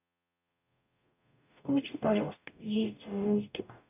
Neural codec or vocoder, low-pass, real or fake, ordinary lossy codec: codec, 44.1 kHz, 0.9 kbps, DAC; 3.6 kHz; fake; none